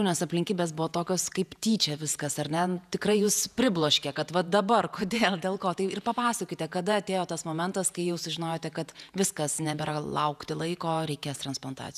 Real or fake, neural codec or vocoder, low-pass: fake; vocoder, 48 kHz, 128 mel bands, Vocos; 14.4 kHz